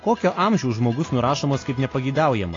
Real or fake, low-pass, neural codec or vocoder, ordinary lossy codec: real; 7.2 kHz; none; AAC, 32 kbps